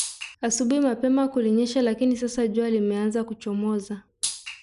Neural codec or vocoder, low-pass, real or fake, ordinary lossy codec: none; 10.8 kHz; real; none